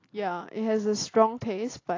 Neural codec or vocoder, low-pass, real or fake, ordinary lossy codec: none; 7.2 kHz; real; AAC, 32 kbps